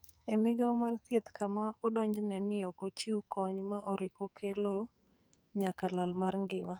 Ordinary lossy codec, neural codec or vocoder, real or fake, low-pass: none; codec, 44.1 kHz, 2.6 kbps, SNAC; fake; none